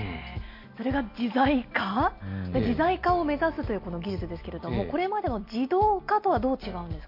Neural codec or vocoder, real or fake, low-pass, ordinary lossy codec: none; real; 5.4 kHz; MP3, 32 kbps